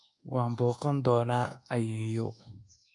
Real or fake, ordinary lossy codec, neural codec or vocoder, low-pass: fake; MP3, 64 kbps; codec, 24 kHz, 1.2 kbps, DualCodec; 10.8 kHz